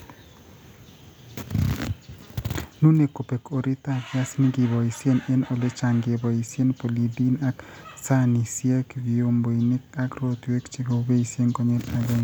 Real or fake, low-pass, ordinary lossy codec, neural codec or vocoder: real; none; none; none